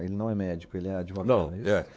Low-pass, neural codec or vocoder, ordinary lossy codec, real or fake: none; codec, 16 kHz, 4 kbps, X-Codec, WavLM features, trained on Multilingual LibriSpeech; none; fake